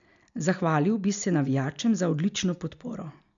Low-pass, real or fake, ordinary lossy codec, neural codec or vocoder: 7.2 kHz; real; none; none